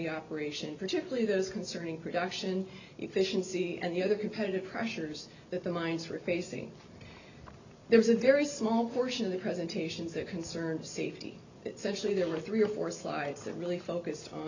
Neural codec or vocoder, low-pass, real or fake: none; 7.2 kHz; real